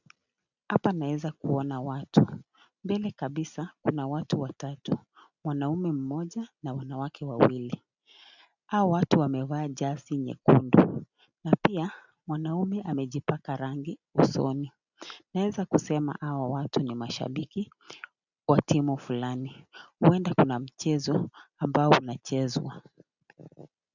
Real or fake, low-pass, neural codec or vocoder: real; 7.2 kHz; none